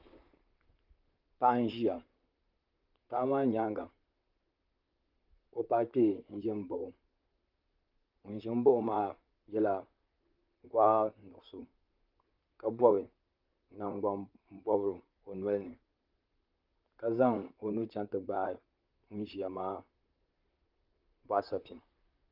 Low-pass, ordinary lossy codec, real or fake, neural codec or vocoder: 5.4 kHz; Opus, 24 kbps; fake; vocoder, 44.1 kHz, 128 mel bands, Pupu-Vocoder